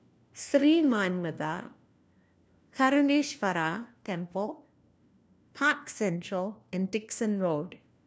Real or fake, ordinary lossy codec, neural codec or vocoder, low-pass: fake; none; codec, 16 kHz, 1 kbps, FunCodec, trained on LibriTTS, 50 frames a second; none